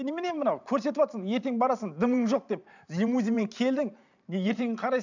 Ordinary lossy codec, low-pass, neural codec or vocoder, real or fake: none; 7.2 kHz; vocoder, 44.1 kHz, 128 mel bands every 512 samples, BigVGAN v2; fake